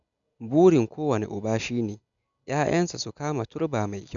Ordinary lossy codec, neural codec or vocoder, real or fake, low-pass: none; none; real; 7.2 kHz